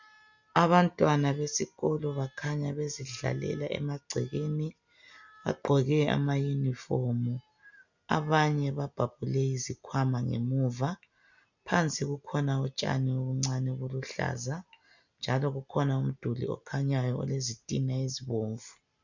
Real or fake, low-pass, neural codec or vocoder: real; 7.2 kHz; none